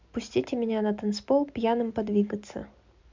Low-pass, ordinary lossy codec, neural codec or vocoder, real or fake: 7.2 kHz; MP3, 64 kbps; none; real